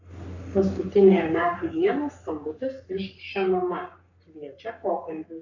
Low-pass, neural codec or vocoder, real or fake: 7.2 kHz; codec, 44.1 kHz, 3.4 kbps, Pupu-Codec; fake